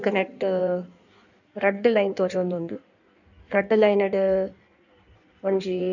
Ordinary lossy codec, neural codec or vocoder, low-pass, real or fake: none; codec, 16 kHz in and 24 kHz out, 1.1 kbps, FireRedTTS-2 codec; 7.2 kHz; fake